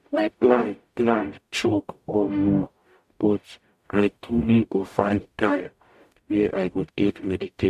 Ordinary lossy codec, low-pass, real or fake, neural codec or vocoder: MP3, 64 kbps; 14.4 kHz; fake; codec, 44.1 kHz, 0.9 kbps, DAC